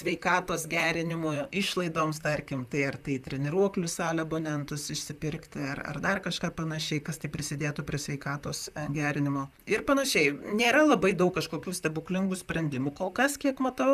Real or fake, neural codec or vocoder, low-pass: fake; vocoder, 44.1 kHz, 128 mel bands, Pupu-Vocoder; 14.4 kHz